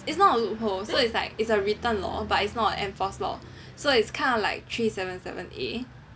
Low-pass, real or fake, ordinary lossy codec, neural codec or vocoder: none; real; none; none